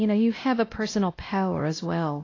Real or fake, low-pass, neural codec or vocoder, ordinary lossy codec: fake; 7.2 kHz; codec, 16 kHz, 0.5 kbps, X-Codec, WavLM features, trained on Multilingual LibriSpeech; AAC, 32 kbps